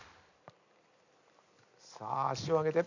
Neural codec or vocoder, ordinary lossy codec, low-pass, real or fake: vocoder, 44.1 kHz, 128 mel bands every 256 samples, BigVGAN v2; none; 7.2 kHz; fake